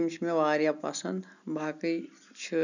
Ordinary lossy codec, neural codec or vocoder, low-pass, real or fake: none; none; 7.2 kHz; real